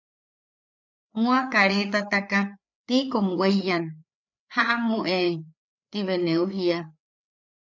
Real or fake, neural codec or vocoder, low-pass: fake; codec, 16 kHz, 4 kbps, FreqCodec, larger model; 7.2 kHz